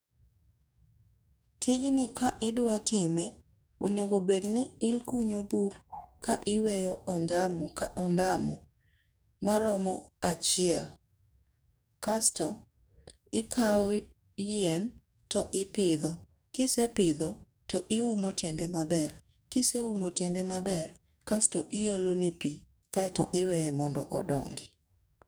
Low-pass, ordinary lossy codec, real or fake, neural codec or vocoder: none; none; fake; codec, 44.1 kHz, 2.6 kbps, DAC